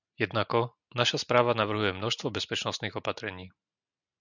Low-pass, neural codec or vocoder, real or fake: 7.2 kHz; none; real